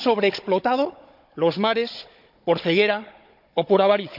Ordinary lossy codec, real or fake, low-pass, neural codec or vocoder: none; fake; 5.4 kHz; codec, 16 kHz, 16 kbps, FunCodec, trained on Chinese and English, 50 frames a second